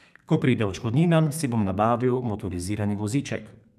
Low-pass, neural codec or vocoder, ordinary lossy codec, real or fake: 14.4 kHz; codec, 32 kHz, 1.9 kbps, SNAC; none; fake